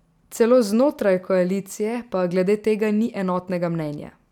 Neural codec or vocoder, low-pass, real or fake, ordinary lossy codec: none; 19.8 kHz; real; none